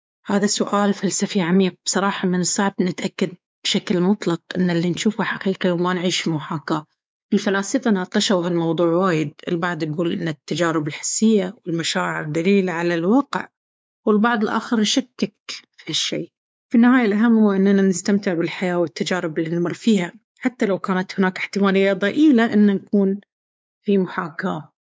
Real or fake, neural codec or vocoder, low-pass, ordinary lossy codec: fake; codec, 16 kHz, 4 kbps, X-Codec, WavLM features, trained on Multilingual LibriSpeech; none; none